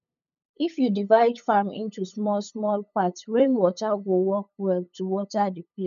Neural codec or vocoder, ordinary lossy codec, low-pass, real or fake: codec, 16 kHz, 8 kbps, FunCodec, trained on LibriTTS, 25 frames a second; none; 7.2 kHz; fake